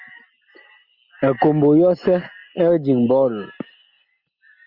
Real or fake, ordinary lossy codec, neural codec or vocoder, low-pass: real; Opus, 64 kbps; none; 5.4 kHz